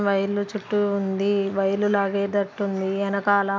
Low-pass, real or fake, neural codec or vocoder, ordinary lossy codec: none; real; none; none